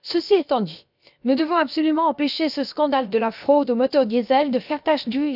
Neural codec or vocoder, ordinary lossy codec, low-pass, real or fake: codec, 16 kHz, about 1 kbps, DyCAST, with the encoder's durations; none; 5.4 kHz; fake